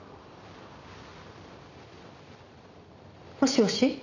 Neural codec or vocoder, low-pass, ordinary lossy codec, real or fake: vocoder, 44.1 kHz, 128 mel bands every 256 samples, BigVGAN v2; 7.2 kHz; none; fake